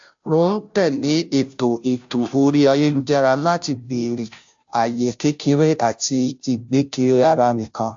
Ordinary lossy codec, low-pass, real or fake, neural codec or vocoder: AAC, 64 kbps; 7.2 kHz; fake; codec, 16 kHz, 0.5 kbps, FunCodec, trained on Chinese and English, 25 frames a second